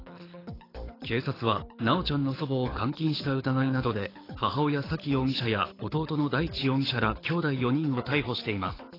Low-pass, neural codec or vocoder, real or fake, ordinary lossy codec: 5.4 kHz; codec, 24 kHz, 6 kbps, HILCodec; fake; AAC, 24 kbps